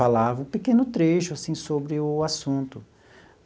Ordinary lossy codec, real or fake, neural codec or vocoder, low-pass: none; real; none; none